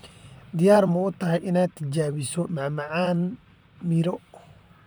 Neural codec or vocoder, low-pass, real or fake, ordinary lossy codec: vocoder, 44.1 kHz, 128 mel bands, Pupu-Vocoder; none; fake; none